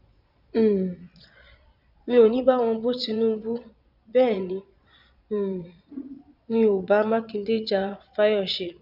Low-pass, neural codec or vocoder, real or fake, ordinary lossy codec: 5.4 kHz; vocoder, 22.05 kHz, 80 mel bands, Vocos; fake; none